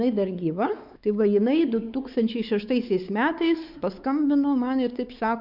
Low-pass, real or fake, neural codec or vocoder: 5.4 kHz; fake; codec, 16 kHz, 4 kbps, X-Codec, WavLM features, trained on Multilingual LibriSpeech